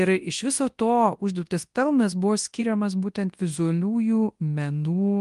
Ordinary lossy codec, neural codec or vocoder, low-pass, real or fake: Opus, 24 kbps; codec, 24 kHz, 0.9 kbps, WavTokenizer, large speech release; 10.8 kHz; fake